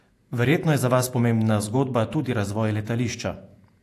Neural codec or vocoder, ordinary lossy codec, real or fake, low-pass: vocoder, 48 kHz, 128 mel bands, Vocos; AAC, 64 kbps; fake; 14.4 kHz